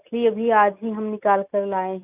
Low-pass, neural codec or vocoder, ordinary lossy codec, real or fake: 3.6 kHz; none; none; real